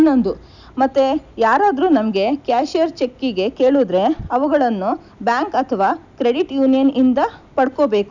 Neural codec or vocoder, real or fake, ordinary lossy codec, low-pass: none; real; none; 7.2 kHz